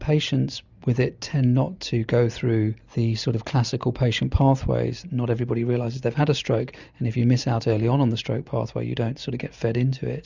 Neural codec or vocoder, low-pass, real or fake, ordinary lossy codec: none; 7.2 kHz; real; Opus, 64 kbps